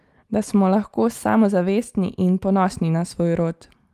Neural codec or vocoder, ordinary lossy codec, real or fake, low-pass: none; Opus, 32 kbps; real; 14.4 kHz